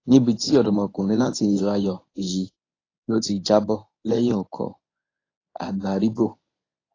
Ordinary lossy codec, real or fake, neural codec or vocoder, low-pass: AAC, 32 kbps; fake; codec, 24 kHz, 0.9 kbps, WavTokenizer, medium speech release version 1; 7.2 kHz